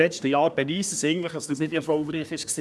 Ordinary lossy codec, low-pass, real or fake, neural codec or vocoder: none; none; fake; codec, 24 kHz, 1 kbps, SNAC